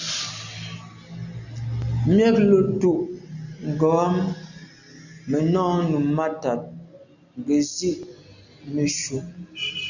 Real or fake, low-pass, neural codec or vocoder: real; 7.2 kHz; none